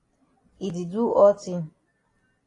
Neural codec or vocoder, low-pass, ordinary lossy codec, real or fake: none; 10.8 kHz; AAC, 32 kbps; real